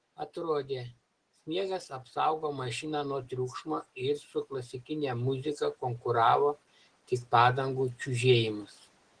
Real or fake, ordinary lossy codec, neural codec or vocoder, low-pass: real; Opus, 16 kbps; none; 9.9 kHz